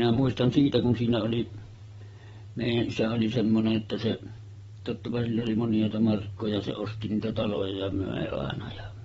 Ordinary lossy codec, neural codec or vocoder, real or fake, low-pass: AAC, 24 kbps; vocoder, 22.05 kHz, 80 mel bands, WaveNeXt; fake; 9.9 kHz